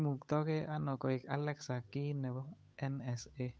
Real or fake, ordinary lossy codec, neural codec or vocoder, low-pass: fake; none; codec, 16 kHz, 8 kbps, FunCodec, trained on Chinese and English, 25 frames a second; none